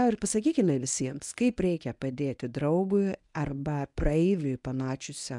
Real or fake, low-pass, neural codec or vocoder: fake; 10.8 kHz; codec, 24 kHz, 0.9 kbps, WavTokenizer, medium speech release version 1